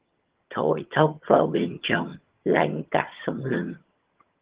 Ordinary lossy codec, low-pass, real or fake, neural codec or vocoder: Opus, 16 kbps; 3.6 kHz; fake; vocoder, 22.05 kHz, 80 mel bands, HiFi-GAN